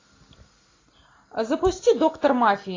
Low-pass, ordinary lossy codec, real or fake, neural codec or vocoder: 7.2 kHz; AAC, 32 kbps; real; none